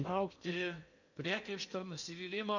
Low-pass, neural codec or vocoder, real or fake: 7.2 kHz; codec, 16 kHz in and 24 kHz out, 0.8 kbps, FocalCodec, streaming, 65536 codes; fake